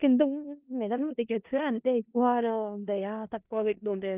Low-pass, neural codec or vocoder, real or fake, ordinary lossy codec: 3.6 kHz; codec, 16 kHz in and 24 kHz out, 0.4 kbps, LongCat-Audio-Codec, four codebook decoder; fake; Opus, 24 kbps